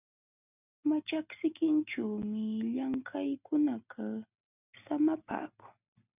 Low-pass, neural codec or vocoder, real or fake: 3.6 kHz; none; real